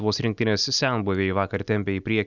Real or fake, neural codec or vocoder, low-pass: real; none; 7.2 kHz